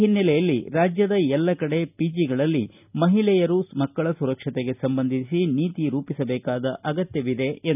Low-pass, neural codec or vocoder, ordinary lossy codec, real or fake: 3.6 kHz; none; none; real